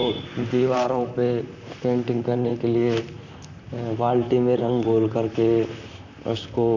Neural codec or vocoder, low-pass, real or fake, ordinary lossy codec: vocoder, 44.1 kHz, 128 mel bands, Pupu-Vocoder; 7.2 kHz; fake; none